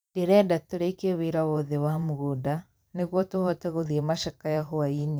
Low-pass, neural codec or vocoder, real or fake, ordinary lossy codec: none; vocoder, 44.1 kHz, 128 mel bands every 512 samples, BigVGAN v2; fake; none